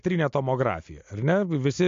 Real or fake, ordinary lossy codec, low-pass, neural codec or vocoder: real; MP3, 48 kbps; 7.2 kHz; none